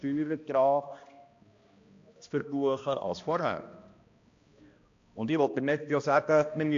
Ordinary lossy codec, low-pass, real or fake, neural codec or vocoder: AAC, 48 kbps; 7.2 kHz; fake; codec, 16 kHz, 1 kbps, X-Codec, HuBERT features, trained on balanced general audio